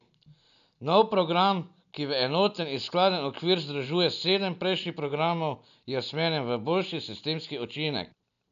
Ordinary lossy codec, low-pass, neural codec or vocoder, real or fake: none; 7.2 kHz; none; real